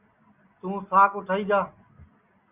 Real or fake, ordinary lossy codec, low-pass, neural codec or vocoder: real; Opus, 64 kbps; 3.6 kHz; none